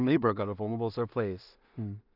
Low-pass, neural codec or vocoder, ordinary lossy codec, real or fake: 5.4 kHz; codec, 16 kHz in and 24 kHz out, 0.4 kbps, LongCat-Audio-Codec, two codebook decoder; none; fake